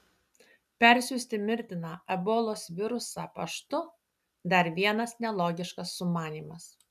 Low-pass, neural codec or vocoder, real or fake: 14.4 kHz; none; real